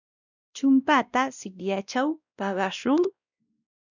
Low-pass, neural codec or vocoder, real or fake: 7.2 kHz; codec, 16 kHz, 1 kbps, X-Codec, WavLM features, trained on Multilingual LibriSpeech; fake